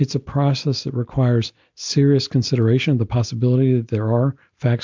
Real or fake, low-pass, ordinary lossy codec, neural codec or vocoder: real; 7.2 kHz; MP3, 64 kbps; none